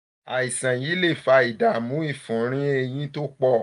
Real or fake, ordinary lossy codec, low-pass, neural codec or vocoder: real; none; 10.8 kHz; none